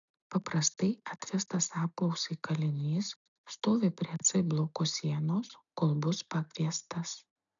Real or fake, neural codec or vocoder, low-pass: real; none; 7.2 kHz